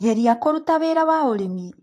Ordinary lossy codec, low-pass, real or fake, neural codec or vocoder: AAC, 48 kbps; 14.4 kHz; fake; vocoder, 44.1 kHz, 128 mel bands, Pupu-Vocoder